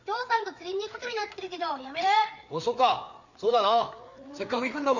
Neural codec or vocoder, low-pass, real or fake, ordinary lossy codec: codec, 16 kHz, 4 kbps, FreqCodec, larger model; 7.2 kHz; fake; none